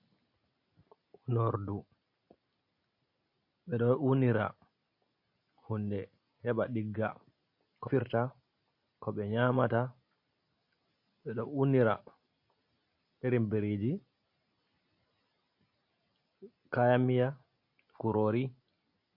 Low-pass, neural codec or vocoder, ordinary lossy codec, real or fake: 5.4 kHz; none; MP3, 32 kbps; real